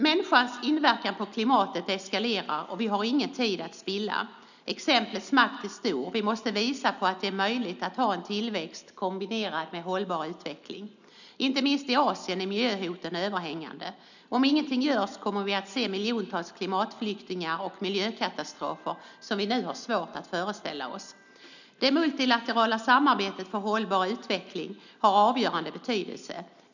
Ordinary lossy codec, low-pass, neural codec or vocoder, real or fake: none; 7.2 kHz; none; real